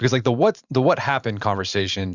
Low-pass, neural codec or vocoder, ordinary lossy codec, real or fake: 7.2 kHz; vocoder, 44.1 kHz, 128 mel bands every 512 samples, BigVGAN v2; Opus, 64 kbps; fake